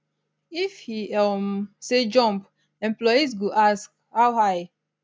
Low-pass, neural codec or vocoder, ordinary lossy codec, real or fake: none; none; none; real